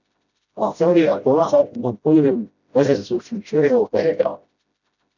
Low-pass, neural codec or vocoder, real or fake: 7.2 kHz; codec, 16 kHz, 0.5 kbps, FreqCodec, smaller model; fake